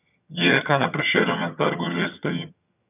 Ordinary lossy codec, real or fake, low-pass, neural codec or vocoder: none; fake; 3.6 kHz; vocoder, 22.05 kHz, 80 mel bands, HiFi-GAN